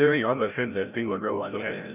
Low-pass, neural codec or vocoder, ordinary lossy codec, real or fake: 3.6 kHz; codec, 16 kHz, 0.5 kbps, FreqCodec, larger model; none; fake